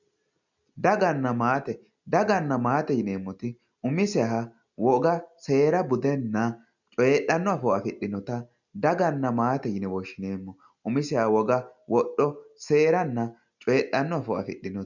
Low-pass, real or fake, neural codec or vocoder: 7.2 kHz; real; none